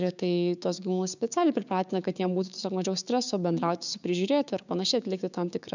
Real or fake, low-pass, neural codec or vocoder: fake; 7.2 kHz; codec, 16 kHz, 6 kbps, DAC